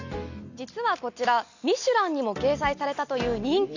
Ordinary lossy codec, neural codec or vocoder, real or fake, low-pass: none; none; real; 7.2 kHz